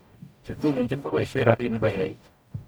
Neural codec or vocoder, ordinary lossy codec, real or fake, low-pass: codec, 44.1 kHz, 0.9 kbps, DAC; none; fake; none